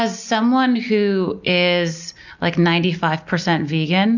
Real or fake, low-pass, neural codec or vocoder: real; 7.2 kHz; none